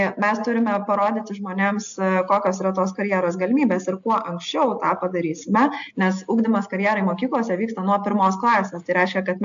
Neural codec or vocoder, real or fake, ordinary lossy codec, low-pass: none; real; AAC, 64 kbps; 7.2 kHz